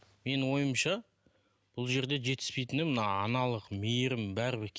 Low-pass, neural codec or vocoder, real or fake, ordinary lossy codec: none; none; real; none